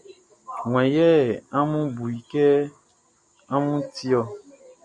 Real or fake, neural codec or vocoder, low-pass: real; none; 10.8 kHz